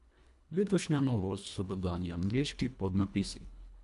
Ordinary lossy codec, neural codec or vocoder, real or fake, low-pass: none; codec, 24 kHz, 1.5 kbps, HILCodec; fake; 10.8 kHz